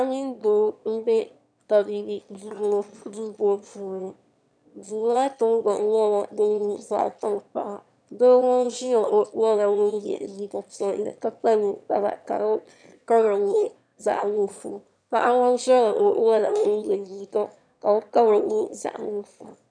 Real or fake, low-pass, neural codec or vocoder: fake; 9.9 kHz; autoencoder, 22.05 kHz, a latent of 192 numbers a frame, VITS, trained on one speaker